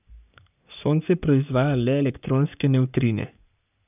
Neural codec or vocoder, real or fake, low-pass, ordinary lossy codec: codec, 44.1 kHz, 3.4 kbps, Pupu-Codec; fake; 3.6 kHz; none